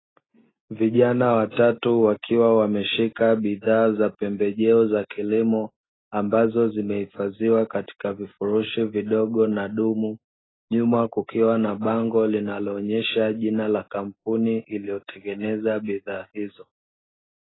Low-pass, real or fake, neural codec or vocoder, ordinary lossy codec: 7.2 kHz; real; none; AAC, 16 kbps